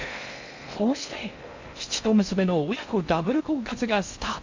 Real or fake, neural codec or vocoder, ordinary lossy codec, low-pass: fake; codec, 16 kHz in and 24 kHz out, 0.6 kbps, FocalCodec, streaming, 2048 codes; MP3, 64 kbps; 7.2 kHz